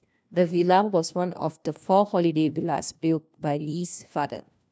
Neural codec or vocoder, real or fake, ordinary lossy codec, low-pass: codec, 16 kHz, 1 kbps, FunCodec, trained on LibriTTS, 50 frames a second; fake; none; none